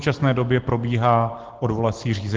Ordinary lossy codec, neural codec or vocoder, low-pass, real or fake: Opus, 16 kbps; none; 7.2 kHz; real